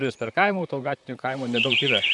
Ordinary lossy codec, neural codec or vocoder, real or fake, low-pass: MP3, 96 kbps; vocoder, 44.1 kHz, 128 mel bands, Pupu-Vocoder; fake; 10.8 kHz